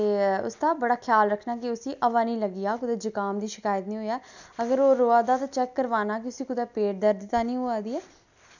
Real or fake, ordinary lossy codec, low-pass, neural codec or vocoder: real; none; 7.2 kHz; none